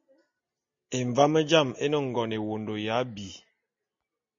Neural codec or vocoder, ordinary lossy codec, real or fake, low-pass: none; MP3, 48 kbps; real; 7.2 kHz